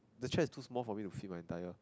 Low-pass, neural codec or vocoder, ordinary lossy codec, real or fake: none; none; none; real